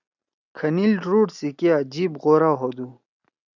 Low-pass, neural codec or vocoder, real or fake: 7.2 kHz; none; real